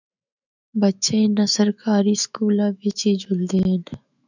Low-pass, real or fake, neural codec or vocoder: 7.2 kHz; fake; autoencoder, 48 kHz, 128 numbers a frame, DAC-VAE, trained on Japanese speech